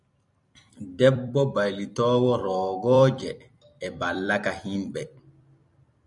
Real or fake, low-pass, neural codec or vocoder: fake; 10.8 kHz; vocoder, 44.1 kHz, 128 mel bands every 256 samples, BigVGAN v2